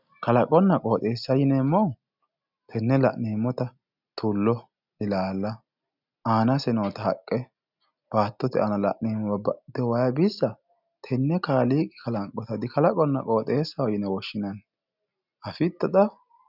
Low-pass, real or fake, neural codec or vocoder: 5.4 kHz; real; none